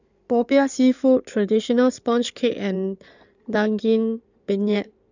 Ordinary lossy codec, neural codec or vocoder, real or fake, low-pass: none; codec, 16 kHz in and 24 kHz out, 2.2 kbps, FireRedTTS-2 codec; fake; 7.2 kHz